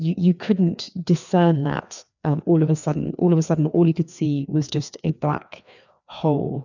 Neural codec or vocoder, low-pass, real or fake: codec, 16 kHz, 2 kbps, FreqCodec, larger model; 7.2 kHz; fake